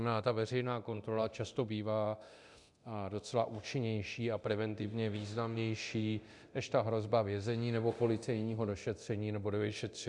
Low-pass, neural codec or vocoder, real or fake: 10.8 kHz; codec, 24 kHz, 0.9 kbps, DualCodec; fake